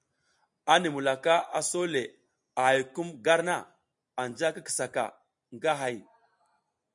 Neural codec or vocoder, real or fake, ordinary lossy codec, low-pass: none; real; MP3, 64 kbps; 10.8 kHz